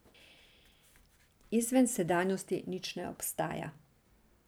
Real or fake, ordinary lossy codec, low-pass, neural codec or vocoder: real; none; none; none